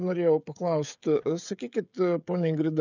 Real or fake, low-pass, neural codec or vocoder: fake; 7.2 kHz; codec, 16 kHz, 16 kbps, FreqCodec, smaller model